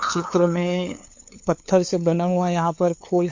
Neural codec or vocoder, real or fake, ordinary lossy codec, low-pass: codec, 16 kHz, 2 kbps, FunCodec, trained on LibriTTS, 25 frames a second; fake; MP3, 48 kbps; 7.2 kHz